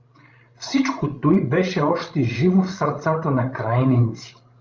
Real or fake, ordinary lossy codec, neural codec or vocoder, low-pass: fake; Opus, 24 kbps; codec, 16 kHz, 16 kbps, FreqCodec, larger model; 7.2 kHz